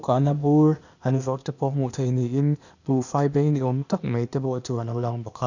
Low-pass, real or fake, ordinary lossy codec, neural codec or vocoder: 7.2 kHz; fake; none; codec, 16 kHz, 0.8 kbps, ZipCodec